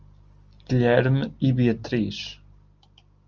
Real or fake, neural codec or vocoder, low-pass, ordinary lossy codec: real; none; 7.2 kHz; Opus, 24 kbps